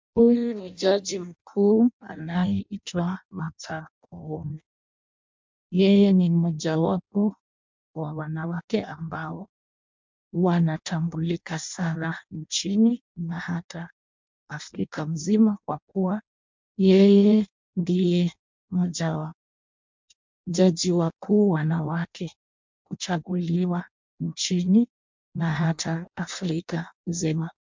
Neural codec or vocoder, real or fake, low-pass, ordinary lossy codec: codec, 16 kHz in and 24 kHz out, 0.6 kbps, FireRedTTS-2 codec; fake; 7.2 kHz; MP3, 64 kbps